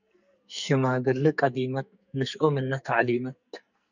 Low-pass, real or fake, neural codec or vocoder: 7.2 kHz; fake; codec, 44.1 kHz, 2.6 kbps, SNAC